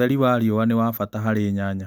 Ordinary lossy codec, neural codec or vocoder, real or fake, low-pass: none; none; real; none